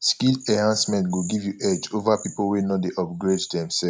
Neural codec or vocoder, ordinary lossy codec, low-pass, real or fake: none; none; none; real